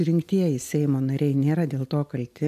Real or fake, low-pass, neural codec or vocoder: real; 14.4 kHz; none